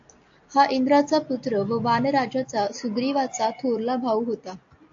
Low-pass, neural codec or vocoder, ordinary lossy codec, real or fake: 7.2 kHz; none; AAC, 48 kbps; real